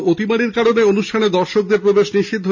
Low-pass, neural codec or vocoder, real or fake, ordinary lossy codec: 7.2 kHz; none; real; none